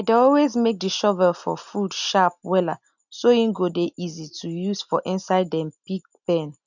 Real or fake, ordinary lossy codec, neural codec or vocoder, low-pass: real; none; none; 7.2 kHz